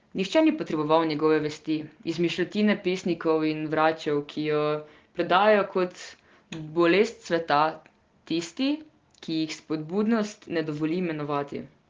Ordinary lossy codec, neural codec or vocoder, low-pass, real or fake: Opus, 16 kbps; none; 7.2 kHz; real